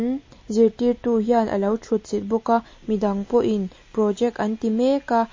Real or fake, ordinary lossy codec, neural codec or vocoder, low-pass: real; MP3, 32 kbps; none; 7.2 kHz